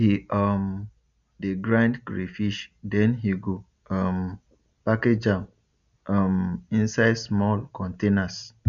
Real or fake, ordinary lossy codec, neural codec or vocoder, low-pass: real; none; none; 7.2 kHz